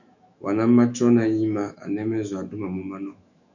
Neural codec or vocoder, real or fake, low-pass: autoencoder, 48 kHz, 128 numbers a frame, DAC-VAE, trained on Japanese speech; fake; 7.2 kHz